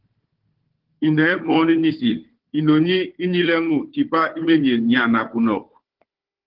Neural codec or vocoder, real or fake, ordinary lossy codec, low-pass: codec, 16 kHz, 4 kbps, FunCodec, trained on Chinese and English, 50 frames a second; fake; Opus, 16 kbps; 5.4 kHz